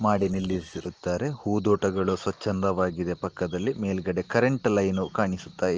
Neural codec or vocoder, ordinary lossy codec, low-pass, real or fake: none; Opus, 16 kbps; 7.2 kHz; real